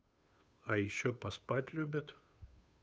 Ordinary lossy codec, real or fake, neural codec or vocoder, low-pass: none; fake; codec, 16 kHz, 2 kbps, FunCodec, trained on Chinese and English, 25 frames a second; none